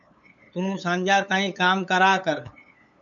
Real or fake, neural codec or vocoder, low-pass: fake; codec, 16 kHz, 8 kbps, FunCodec, trained on LibriTTS, 25 frames a second; 7.2 kHz